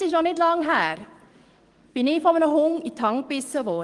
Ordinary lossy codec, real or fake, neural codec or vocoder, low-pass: Opus, 24 kbps; fake; codec, 44.1 kHz, 7.8 kbps, DAC; 10.8 kHz